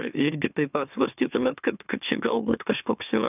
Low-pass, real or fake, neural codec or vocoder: 3.6 kHz; fake; autoencoder, 44.1 kHz, a latent of 192 numbers a frame, MeloTTS